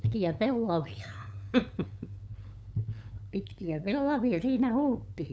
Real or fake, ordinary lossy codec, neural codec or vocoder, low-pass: fake; none; codec, 16 kHz, 8 kbps, FunCodec, trained on LibriTTS, 25 frames a second; none